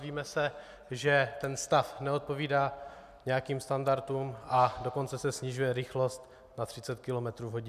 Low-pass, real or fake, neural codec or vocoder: 14.4 kHz; real; none